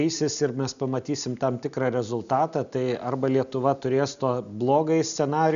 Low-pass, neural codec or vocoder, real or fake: 7.2 kHz; none; real